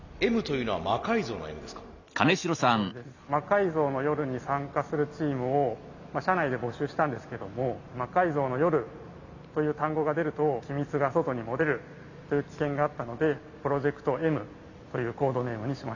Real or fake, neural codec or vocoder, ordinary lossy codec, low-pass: real; none; none; 7.2 kHz